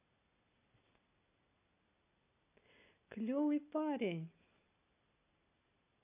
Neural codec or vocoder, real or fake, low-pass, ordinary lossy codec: none; real; 3.6 kHz; AAC, 32 kbps